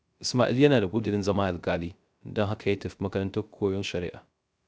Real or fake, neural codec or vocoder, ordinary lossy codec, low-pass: fake; codec, 16 kHz, 0.3 kbps, FocalCodec; none; none